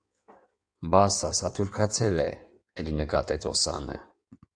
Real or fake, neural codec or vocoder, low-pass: fake; codec, 16 kHz in and 24 kHz out, 1.1 kbps, FireRedTTS-2 codec; 9.9 kHz